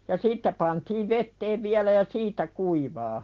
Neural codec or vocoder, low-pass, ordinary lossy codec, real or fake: none; 7.2 kHz; Opus, 16 kbps; real